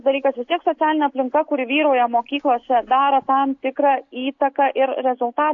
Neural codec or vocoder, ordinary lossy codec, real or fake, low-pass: none; AAC, 48 kbps; real; 7.2 kHz